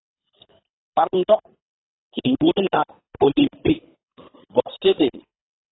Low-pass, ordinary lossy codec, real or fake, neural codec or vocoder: 7.2 kHz; AAC, 16 kbps; fake; codec, 24 kHz, 3 kbps, HILCodec